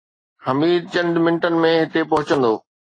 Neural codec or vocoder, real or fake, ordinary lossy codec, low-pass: none; real; AAC, 32 kbps; 9.9 kHz